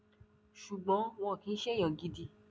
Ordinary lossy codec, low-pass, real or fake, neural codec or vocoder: none; none; real; none